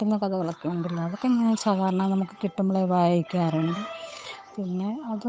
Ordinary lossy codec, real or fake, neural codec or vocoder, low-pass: none; fake; codec, 16 kHz, 8 kbps, FunCodec, trained on Chinese and English, 25 frames a second; none